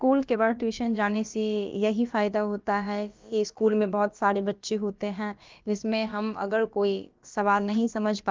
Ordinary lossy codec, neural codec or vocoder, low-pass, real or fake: Opus, 24 kbps; codec, 16 kHz, about 1 kbps, DyCAST, with the encoder's durations; 7.2 kHz; fake